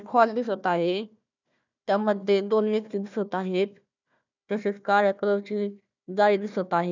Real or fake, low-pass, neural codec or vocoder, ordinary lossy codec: fake; 7.2 kHz; codec, 16 kHz, 1 kbps, FunCodec, trained on Chinese and English, 50 frames a second; none